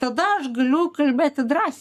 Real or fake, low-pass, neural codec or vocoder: fake; 14.4 kHz; codec, 44.1 kHz, 7.8 kbps, Pupu-Codec